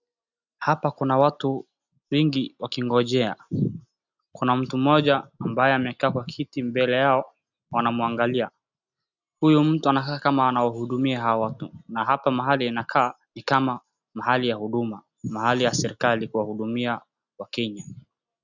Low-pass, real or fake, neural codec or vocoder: 7.2 kHz; real; none